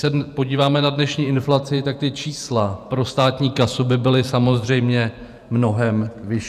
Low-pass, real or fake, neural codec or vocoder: 14.4 kHz; fake; vocoder, 48 kHz, 128 mel bands, Vocos